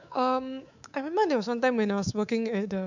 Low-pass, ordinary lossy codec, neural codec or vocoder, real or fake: 7.2 kHz; none; codec, 24 kHz, 3.1 kbps, DualCodec; fake